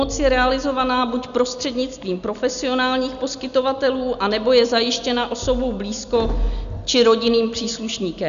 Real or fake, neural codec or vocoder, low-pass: real; none; 7.2 kHz